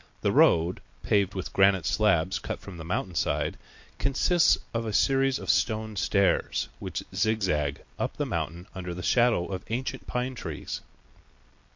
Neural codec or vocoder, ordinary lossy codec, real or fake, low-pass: none; MP3, 48 kbps; real; 7.2 kHz